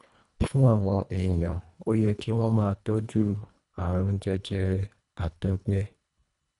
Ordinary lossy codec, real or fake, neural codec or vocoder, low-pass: none; fake; codec, 24 kHz, 1.5 kbps, HILCodec; 10.8 kHz